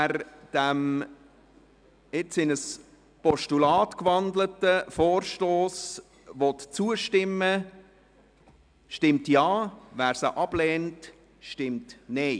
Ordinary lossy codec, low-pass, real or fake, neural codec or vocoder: none; 9.9 kHz; real; none